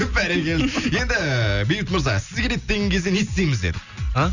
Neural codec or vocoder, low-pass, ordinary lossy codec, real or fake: none; 7.2 kHz; none; real